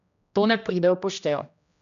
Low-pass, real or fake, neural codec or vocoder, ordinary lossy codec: 7.2 kHz; fake; codec, 16 kHz, 1 kbps, X-Codec, HuBERT features, trained on general audio; none